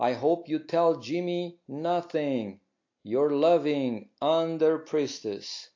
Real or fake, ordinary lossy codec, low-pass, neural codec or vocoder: real; AAC, 48 kbps; 7.2 kHz; none